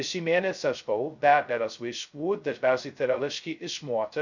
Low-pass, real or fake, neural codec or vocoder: 7.2 kHz; fake; codec, 16 kHz, 0.2 kbps, FocalCodec